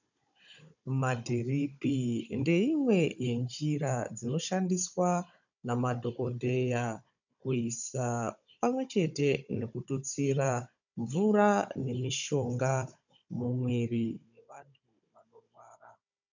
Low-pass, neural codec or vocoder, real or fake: 7.2 kHz; codec, 16 kHz, 16 kbps, FunCodec, trained on Chinese and English, 50 frames a second; fake